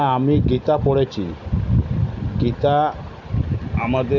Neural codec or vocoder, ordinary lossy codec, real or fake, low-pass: none; none; real; 7.2 kHz